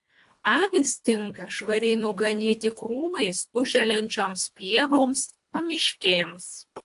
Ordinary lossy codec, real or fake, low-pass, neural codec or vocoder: AAC, 64 kbps; fake; 10.8 kHz; codec, 24 kHz, 1.5 kbps, HILCodec